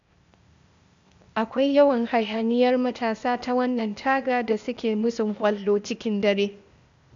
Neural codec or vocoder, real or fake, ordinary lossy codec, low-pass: codec, 16 kHz, 0.8 kbps, ZipCodec; fake; AAC, 64 kbps; 7.2 kHz